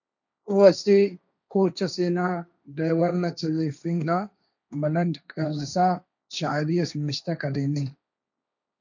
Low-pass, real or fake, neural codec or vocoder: 7.2 kHz; fake; codec, 16 kHz, 1.1 kbps, Voila-Tokenizer